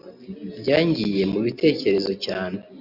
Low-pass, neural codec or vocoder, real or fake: 5.4 kHz; none; real